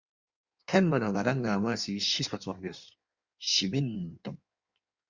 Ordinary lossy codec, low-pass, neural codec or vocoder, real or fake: Opus, 64 kbps; 7.2 kHz; codec, 16 kHz in and 24 kHz out, 1.1 kbps, FireRedTTS-2 codec; fake